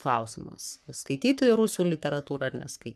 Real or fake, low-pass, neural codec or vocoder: fake; 14.4 kHz; codec, 44.1 kHz, 3.4 kbps, Pupu-Codec